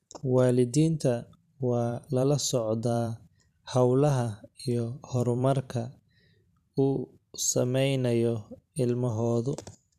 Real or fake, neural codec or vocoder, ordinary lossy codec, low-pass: fake; vocoder, 44.1 kHz, 128 mel bands every 512 samples, BigVGAN v2; none; 14.4 kHz